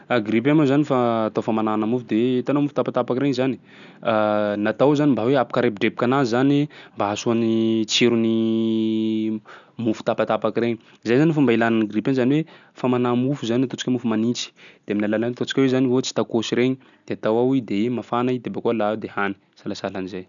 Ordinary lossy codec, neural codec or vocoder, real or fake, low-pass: none; none; real; 7.2 kHz